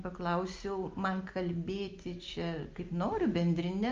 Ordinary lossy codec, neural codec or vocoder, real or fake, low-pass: Opus, 24 kbps; none; real; 7.2 kHz